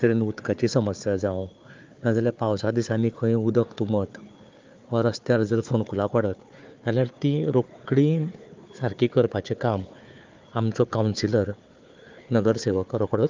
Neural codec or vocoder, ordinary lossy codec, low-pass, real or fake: codec, 16 kHz, 4 kbps, FunCodec, trained on Chinese and English, 50 frames a second; Opus, 24 kbps; 7.2 kHz; fake